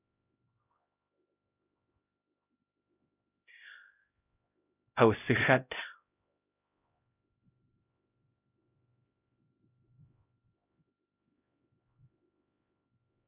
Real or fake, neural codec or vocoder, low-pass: fake; codec, 16 kHz, 0.5 kbps, X-Codec, HuBERT features, trained on LibriSpeech; 3.6 kHz